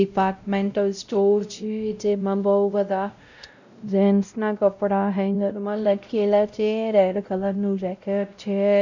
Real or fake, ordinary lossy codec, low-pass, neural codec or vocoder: fake; none; 7.2 kHz; codec, 16 kHz, 0.5 kbps, X-Codec, WavLM features, trained on Multilingual LibriSpeech